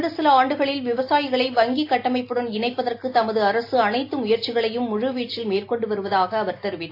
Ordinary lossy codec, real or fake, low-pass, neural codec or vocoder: AAC, 32 kbps; real; 5.4 kHz; none